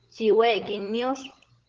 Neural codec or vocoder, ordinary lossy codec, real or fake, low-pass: codec, 16 kHz, 16 kbps, FunCodec, trained on LibriTTS, 50 frames a second; Opus, 16 kbps; fake; 7.2 kHz